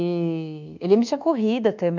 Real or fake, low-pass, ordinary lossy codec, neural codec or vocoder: fake; 7.2 kHz; none; codec, 24 kHz, 1.2 kbps, DualCodec